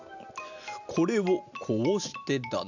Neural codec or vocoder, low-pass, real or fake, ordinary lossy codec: none; 7.2 kHz; real; none